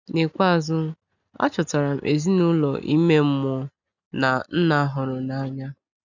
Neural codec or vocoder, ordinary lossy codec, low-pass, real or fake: none; none; 7.2 kHz; real